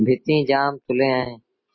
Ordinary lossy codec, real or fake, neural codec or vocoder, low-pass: MP3, 24 kbps; real; none; 7.2 kHz